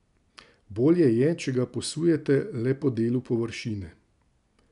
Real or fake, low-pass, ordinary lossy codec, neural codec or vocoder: real; 10.8 kHz; none; none